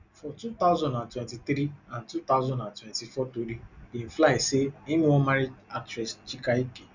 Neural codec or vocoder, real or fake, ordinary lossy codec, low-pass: none; real; none; 7.2 kHz